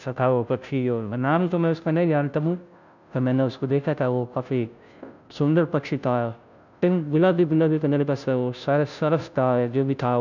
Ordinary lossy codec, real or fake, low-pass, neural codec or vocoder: none; fake; 7.2 kHz; codec, 16 kHz, 0.5 kbps, FunCodec, trained on Chinese and English, 25 frames a second